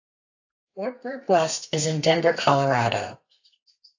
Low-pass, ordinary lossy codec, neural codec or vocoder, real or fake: 7.2 kHz; AAC, 48 kbps; codec, 32 kHz, 1.9 kbps, SNAC; fake